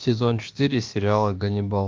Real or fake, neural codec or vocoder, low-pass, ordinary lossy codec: fake; codec, 16 kHz, about 1 kbps, DyCAST, with the encoder's durations; 7.2 kHz; Opus, 24 kbps